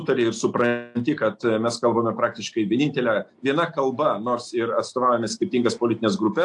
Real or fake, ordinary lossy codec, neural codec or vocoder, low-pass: real; AAC, 64 kbps; none; 10.8 kHz